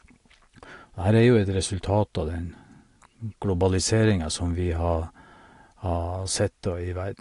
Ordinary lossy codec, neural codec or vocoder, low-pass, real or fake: AAC, 48 kbps; none; 10.8 kHz; real